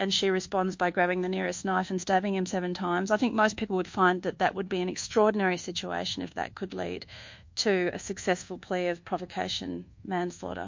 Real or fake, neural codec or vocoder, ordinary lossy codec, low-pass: fake; codec, 24 kHz, 1.2 kbps, DualCodec; MP3, 48 kbps; 7.2 kHz